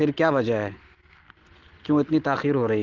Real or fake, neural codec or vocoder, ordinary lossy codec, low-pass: real; none; Opus, 16 kbps; 7.2 kHz